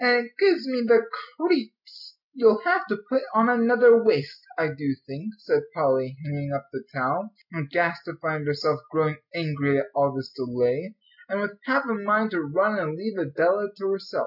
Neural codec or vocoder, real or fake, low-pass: none; real; 5.4 kHz